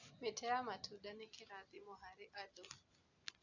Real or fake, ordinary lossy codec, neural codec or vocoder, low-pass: real; none; none; 7.2 kHz